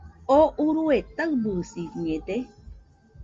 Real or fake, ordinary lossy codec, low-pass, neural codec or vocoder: real; Opus, 32 kbps; 7.2 kHz; none